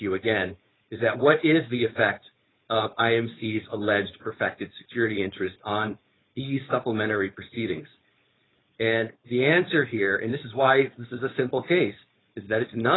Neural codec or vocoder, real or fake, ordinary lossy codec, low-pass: codec, 16 kHz, 4.8 kbps, FACodec; fake; AAC, 16 kbps; 7.2 kHz